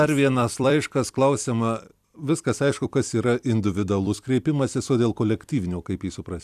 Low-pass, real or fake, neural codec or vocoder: 14.4 kHz; fake; vocoder, 44.1 kHz, 128 mel bands every 256 samples, BigVGAN v2